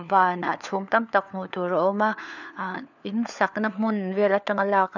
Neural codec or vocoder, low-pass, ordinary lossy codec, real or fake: codec, 16 kHz, 4 kbps, FunCodec, trained on LibriTTS, 50 frames a second; 7.2 kHz; none; fake